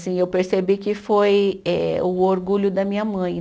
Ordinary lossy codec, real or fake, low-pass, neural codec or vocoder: none; real; none; none